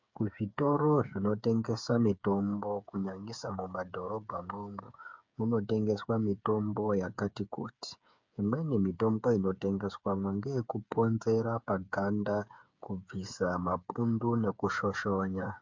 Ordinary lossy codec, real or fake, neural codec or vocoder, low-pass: MP3, 64 kbps; fake; codec, 16 kHz, 8 kbps, FreqCodec, smaller model; 7.2 kHz